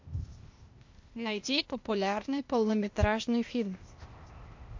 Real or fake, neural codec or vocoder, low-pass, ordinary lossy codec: fake; codec, 16 kHz, 0.8 kbps, ZipCodec; 7.2 kHz; MP3, 48 kbps